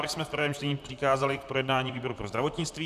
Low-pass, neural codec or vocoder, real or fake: 14.4 kHz; vocoder, 44.1 kHz, 128 mel bands, Pupu-Vocoder; fake